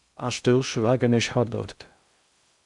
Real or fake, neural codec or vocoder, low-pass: fake; codec, 16 kHz in and 24 kHz out, 0.6 kbps, FocalCodec, streaming, 2048 codes; 10.8 kHz